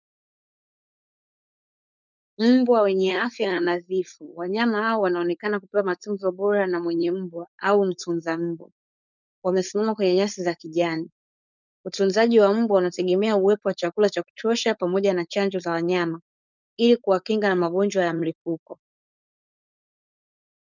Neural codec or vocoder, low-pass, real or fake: codec, 16 kHz, 4.8 kbps, FACodec; 7.2 kHz; fake